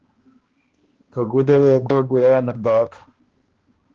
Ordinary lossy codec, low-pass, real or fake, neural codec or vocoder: Opus, 16 kbps; 7.2 kHz; fake; codec, 16 kHz, 1 kbps, X-Codec, HuBERT features, trained on balanced general audio